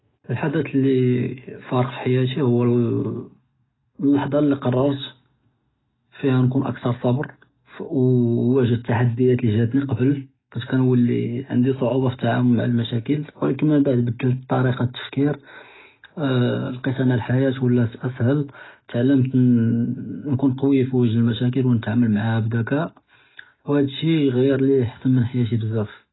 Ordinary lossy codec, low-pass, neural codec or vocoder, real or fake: AAC, 16 kbps; 7.2 kHz; none; real